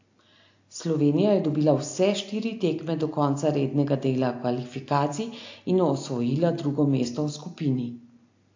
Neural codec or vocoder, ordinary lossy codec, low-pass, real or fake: none; AAC, 48 kbps; 7.2 kHz; real